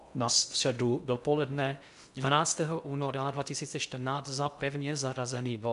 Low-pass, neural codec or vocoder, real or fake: 10.8 kHz; codec, 16 kHz in and 24 kHz out, 0.6 kbps, FocalCodec, streaming, 2048 codes; fake